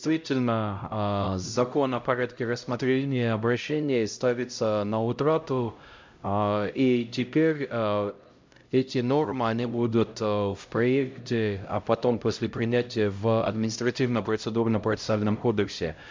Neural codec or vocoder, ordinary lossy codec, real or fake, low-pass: codec, 16 kHz, 0.5 kbps, X-Codec, HuBERT features, trained on LibriSpeech; MP3, 64 kbps; fake; 7.2 kHz